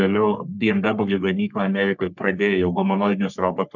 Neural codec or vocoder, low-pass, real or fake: codec, 44.1 kHz, 3.4 kbps, Pupu-Codec; 7.2 kHz; fake